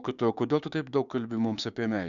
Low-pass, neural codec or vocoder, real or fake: 7.2 kHz; codec, 16 kHz, 6 kbps, DAC; fake